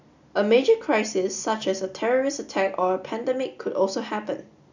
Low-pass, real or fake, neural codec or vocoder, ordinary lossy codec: 7.2 kHz; real; none; none